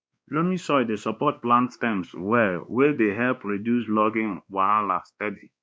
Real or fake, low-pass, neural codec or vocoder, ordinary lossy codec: fake; none; codec, 16 kHz, 2 kbps, X-Codec, WavLM features, trained on Multilingual LibriSpeech; none